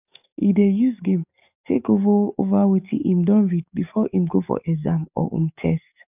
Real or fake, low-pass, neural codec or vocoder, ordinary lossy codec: fake; 3.6 kHz; codec, 44.1 kHz, 7.8 kbps, DAC; none